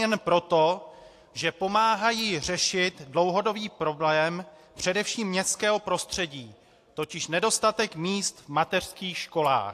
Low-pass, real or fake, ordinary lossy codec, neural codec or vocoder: 14.4 kHz; real; AAC, 64 kbps; none